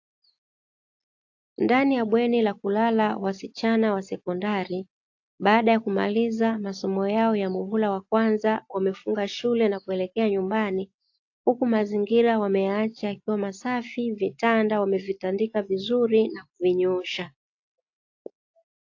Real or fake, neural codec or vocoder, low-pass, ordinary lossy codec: real; none; 7.2 kHz; AAC, 48 kbps